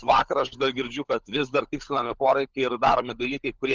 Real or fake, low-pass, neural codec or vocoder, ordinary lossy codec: fake; 7.2 kHz; codec, 16 kHz, 16 kbps, FunCodec, trained on LibriTTS, 50 frames a second; Opus, 32 kbps